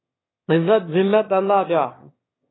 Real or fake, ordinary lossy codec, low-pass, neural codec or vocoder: fake; AAC, 16 kbps; 7.2 kHz; autoencoder, 22.05 kHz, a latent of 192 numbers a frame, VITS, trained on one speaker